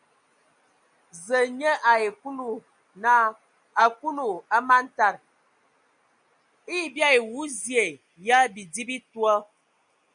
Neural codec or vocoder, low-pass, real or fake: none; 9.9 kHz; real